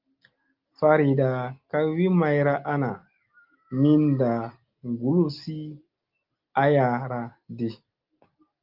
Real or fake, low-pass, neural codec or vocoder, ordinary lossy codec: real; 5.4 kHz; none; Opus, 24 kbps